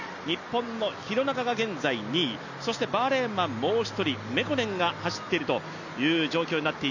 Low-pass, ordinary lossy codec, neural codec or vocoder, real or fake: 7.2 kHz; none; none; real